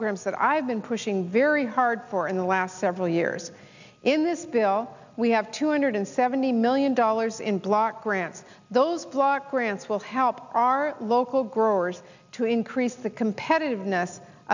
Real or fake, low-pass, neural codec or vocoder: real; 7.2 kHz; none